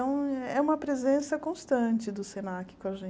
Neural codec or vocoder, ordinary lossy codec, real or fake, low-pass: none; none; real; none